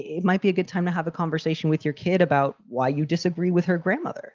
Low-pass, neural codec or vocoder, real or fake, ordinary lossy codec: 7.2 kHz; none; real; Opus, 32 kbps